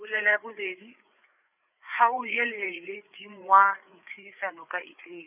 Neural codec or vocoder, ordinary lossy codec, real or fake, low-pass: codec, 16 kHz, 4 kbps, FreqCodec, larger model; none; fake; 3.6 kHz